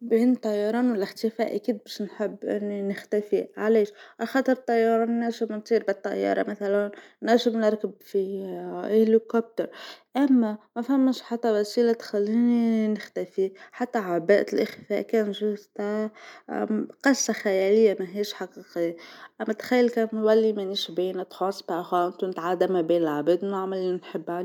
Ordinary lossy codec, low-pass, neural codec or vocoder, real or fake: none; 19.8 kHz; none; real